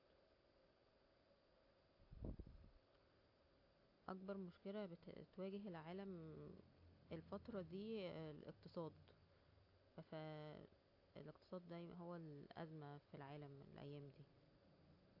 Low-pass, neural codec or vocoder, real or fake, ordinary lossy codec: 5.4 kHz; none; real; AAC, 48 kbps